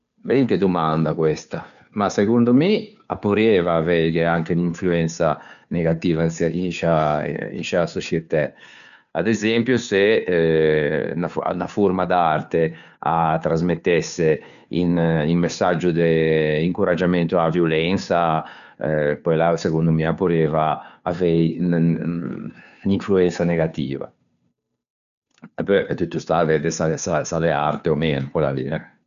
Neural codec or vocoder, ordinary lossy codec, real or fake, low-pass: codec, 16 kHz, 2 kbps, FunCodec, trained on Chinese and English, 25 frames a second; none; fake; 7.2 kHz